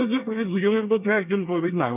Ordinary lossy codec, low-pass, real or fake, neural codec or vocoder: none; 3.6 kHz; fake; codec, 24 kHz, 1 kbps, SNAC